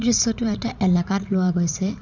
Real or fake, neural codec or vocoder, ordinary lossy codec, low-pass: fake; vocoder, 44.1 kHz, 80 mel bands, Vocos; none; 7.2 kHz